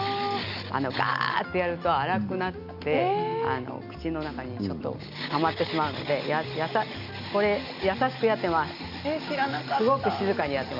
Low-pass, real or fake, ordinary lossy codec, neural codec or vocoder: 5.4 kHz; real; none; none